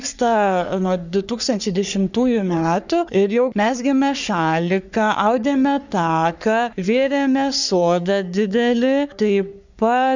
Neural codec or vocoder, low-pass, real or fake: codec, 44.1 kHz, 3.4 kbps, Pupu-Codec; 7.2 kHz; fake